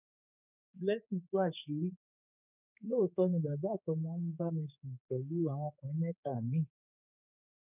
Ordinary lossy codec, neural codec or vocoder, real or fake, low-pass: none; codec, 16 kHz, 4 kbps, X-Codec, HuBERT features, trained on general audio; fake; 3.6 kHz